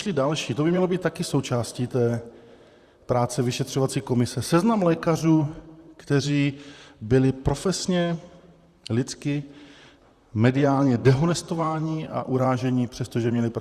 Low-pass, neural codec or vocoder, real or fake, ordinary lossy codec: 14.4 kHz; vocoder, 44.1 kHz, 128 mel bands, Pupu-Vocoder; fake; Opus, 64 kbps